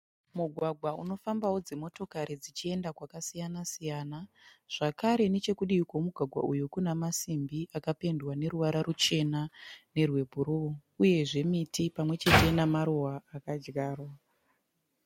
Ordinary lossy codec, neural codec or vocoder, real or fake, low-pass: MP3, 64 kbps; none; real; 19.8 kHz